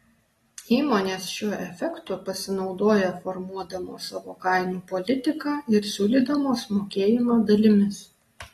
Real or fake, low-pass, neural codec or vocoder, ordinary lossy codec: real; 19.8 kHz; none; AAC, 32 kbps